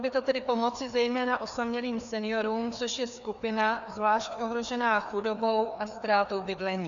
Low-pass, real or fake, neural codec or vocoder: 7.2 kHz; fake; codec, 16 kHz, 2 kbps, FreqCodec, larger model